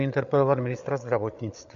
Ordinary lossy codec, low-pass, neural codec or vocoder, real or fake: MP3, 48 kbps; 7.2 kHz; codec, 16 kHz, 8 kbps, FreqCodec, larger model; fake